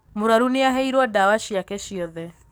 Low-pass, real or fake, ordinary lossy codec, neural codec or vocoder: none; fake; none; codec, 44.1 kHz, 7.8 kbps, DAC